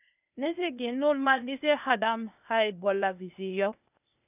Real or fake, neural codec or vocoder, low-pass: fake; codec, 16 kHz, 0.8 kbps, ZipCodec; 3.6 kHz